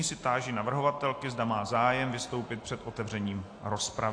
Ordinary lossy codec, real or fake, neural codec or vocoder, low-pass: AAC, 48 kbps; real; none; 9.9 kHz